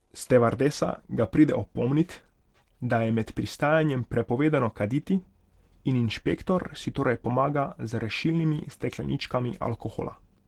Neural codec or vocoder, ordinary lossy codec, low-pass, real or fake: none; Opus, 16 kbps; 19.8 kHz; real